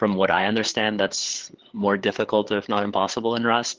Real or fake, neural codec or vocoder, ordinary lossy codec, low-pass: fake; codec, 16 kHz, 4 kbps, FreqCodec, larger model; Opus, 16 kbps; 7.2 kHz